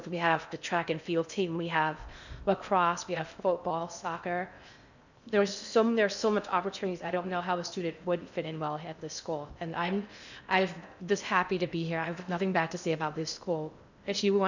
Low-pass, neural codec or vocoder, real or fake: 7.2 kHz; codec, 16 kHz in and 24 kHz out, 0.6 kbps, FocalCodec, streaming, 4096 codes; fake